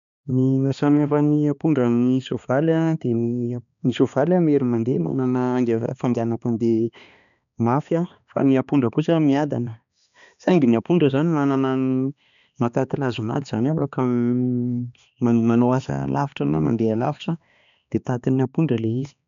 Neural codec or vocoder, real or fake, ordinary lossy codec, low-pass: codec, 16 kHz, 2 kbps, X-Codec, HuBERT features, trained on balanced general audio; fake; none; 7.2 kHz